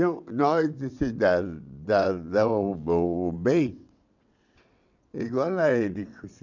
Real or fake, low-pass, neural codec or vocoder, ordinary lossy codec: fake; 7.2 kHz; vocoder, 22.05 kHz, 80 mel bands, WaveNeXt; none